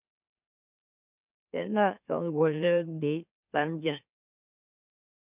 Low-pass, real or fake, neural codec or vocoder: 3.6 kHz; fake; autoencoder, 44.1 kHz, a latent of 192 numbers a frame, MeloTTS